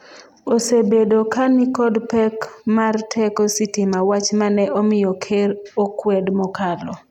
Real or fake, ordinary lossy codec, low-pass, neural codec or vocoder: real; none; 19.8 kHz; none